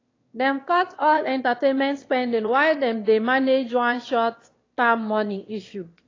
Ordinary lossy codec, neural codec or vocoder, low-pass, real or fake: AAC, 32 kbps; autoencoder, 22.05 kHz, a latent of 192 numbers a frame, VITS, trained on one speaker; 7.2 kHz; fake